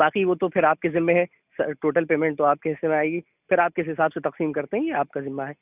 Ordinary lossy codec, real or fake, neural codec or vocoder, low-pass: none; real; none; 3.6 kHz